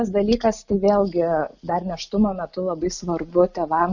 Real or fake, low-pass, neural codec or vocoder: real; 7.2 kHz; none